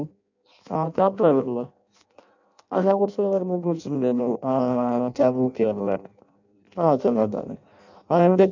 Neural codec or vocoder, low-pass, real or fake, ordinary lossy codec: codec, 16 kHz in and 24 kHz out, 0.6 kbps, FireRedTTS-2 codec; 7.2 kHz; fake; none